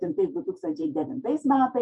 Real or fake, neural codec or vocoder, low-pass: fake; vocoder, 48 kHz, 128 mel bands, Vocos; 10.8 kHz